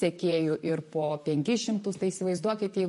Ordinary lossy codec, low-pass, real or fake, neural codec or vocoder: MP3, 48 kbps; 14.4 kHz; fake; vocoder, 44.1 kHz, 128 mel bands, Pupu-Vocoder